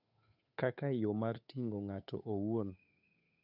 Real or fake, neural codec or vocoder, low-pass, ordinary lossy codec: fake; codec, 16 kHz, 8 kbps, FunCodec, trained on Chinese and English, 25 frames a second; 5.4 kHz; none